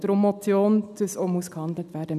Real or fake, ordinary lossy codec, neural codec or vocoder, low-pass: real; none; none; 14.4 kHz